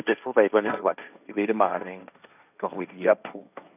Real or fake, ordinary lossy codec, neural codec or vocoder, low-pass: fake; none; codec, 16 kHz, 1.1 kbps, Voila-Tokenizer; 3.6 kHz